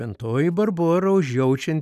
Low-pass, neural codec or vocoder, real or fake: 14.4 kHz; none; real